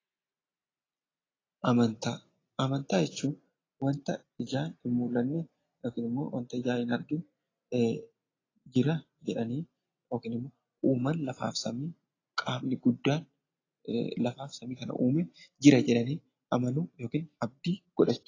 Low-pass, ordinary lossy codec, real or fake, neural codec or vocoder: 7.2 kHz; AAC, 32 kbps; real; none